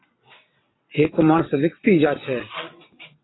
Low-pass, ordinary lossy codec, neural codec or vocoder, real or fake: 7.2 kHz; AAC, 16 kbps; vocoder, 22.05 kHz, 80 mel bands, WaveNeXt; fake